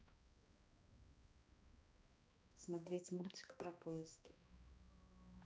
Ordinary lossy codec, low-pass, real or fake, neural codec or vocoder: none; none; fake; codec, 16 kHz, 1 kbps, X-Codec, HuBERT features, trained on balanced general audio